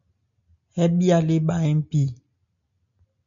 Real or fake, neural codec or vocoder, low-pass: real; none; 7.2 kHz